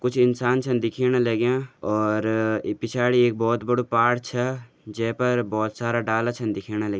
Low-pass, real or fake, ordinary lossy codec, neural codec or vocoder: none; real; none; none